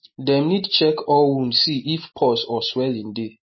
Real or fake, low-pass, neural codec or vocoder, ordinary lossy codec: real; 7.2 kHz; none; MP3, 24 kbps